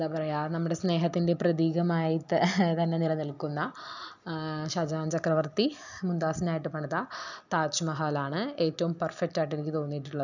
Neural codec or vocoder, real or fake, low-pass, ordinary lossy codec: none; real; 7.2 kHz; none